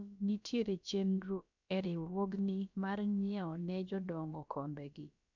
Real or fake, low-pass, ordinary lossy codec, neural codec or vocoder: fake; 7.2 kHz; none; codec, 16 kHz, about 1 kbps, DyCAST, with the encoder's durations